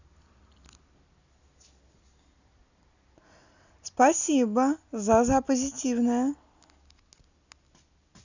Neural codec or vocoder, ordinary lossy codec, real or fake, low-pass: none; none; real; 7.2 kHz